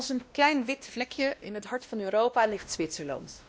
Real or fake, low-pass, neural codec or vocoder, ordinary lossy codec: fake; none; codec, 16 kHz, 1 kbps, X-Codec, WavLM features, trained on Multilingual LibriSpeech; none